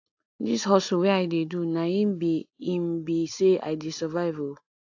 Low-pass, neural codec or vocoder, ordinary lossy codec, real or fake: 7.2 kHz; none; AAC, 48 kbps; real